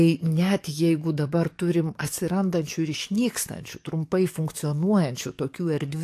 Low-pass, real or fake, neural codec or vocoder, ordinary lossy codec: 14.4 kHz; fake; codec, 44.1 kHz, 7.8 kbps, DAC; AAC, 64 kbps